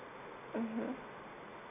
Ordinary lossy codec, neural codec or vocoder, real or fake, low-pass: none; none; real; 3.6 kHz